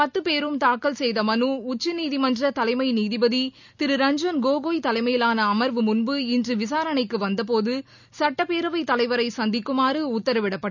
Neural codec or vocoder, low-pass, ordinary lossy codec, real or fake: none; 7.2 kHz; none; real